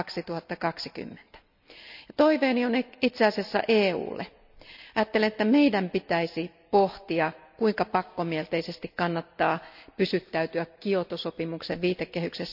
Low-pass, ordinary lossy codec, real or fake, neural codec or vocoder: 5.4 kHz; none; real; none